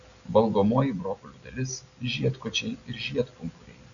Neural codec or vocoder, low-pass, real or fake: none; 7.2 kHz; real